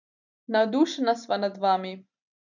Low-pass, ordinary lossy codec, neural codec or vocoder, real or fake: 7.2 kHz; none; none; real